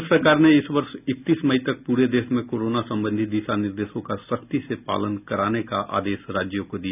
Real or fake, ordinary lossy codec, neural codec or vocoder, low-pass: real; none; none; 3.6 kHz